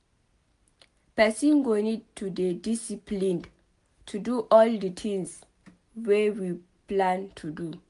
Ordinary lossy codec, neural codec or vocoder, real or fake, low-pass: AAC, 64 kbps; none; real; 10.8 kHz